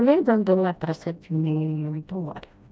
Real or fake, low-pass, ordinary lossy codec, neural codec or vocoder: fake; none; none; codec, 16 kHz, 1 kbps, FreqCodec, smaller model